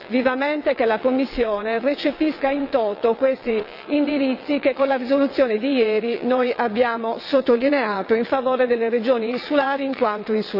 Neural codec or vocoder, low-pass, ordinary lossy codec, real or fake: vocoder, 22.05 kHz, 80 mel bands, WaveNeXt; 5.4 kHz; none; fake